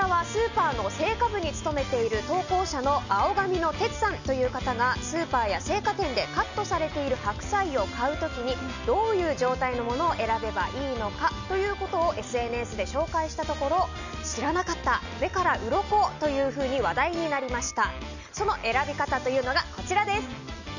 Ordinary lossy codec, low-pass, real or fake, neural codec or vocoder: none; 7.2 kHz; real; none